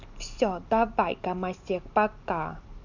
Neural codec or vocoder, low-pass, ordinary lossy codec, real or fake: none; 7.2 kHz; none; real